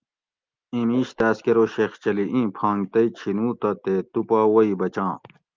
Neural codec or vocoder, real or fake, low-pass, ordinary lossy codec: none; real; 7.2 kHz; Opus, 32 kbps